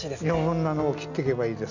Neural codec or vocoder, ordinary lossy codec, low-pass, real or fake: none; none; 7.2 kHz; real